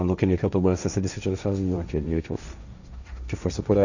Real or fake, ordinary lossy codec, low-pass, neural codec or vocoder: fake; none; 7.2 kHz; codec, 16 kHz, 1.1 kbps, Voila-Tokenizer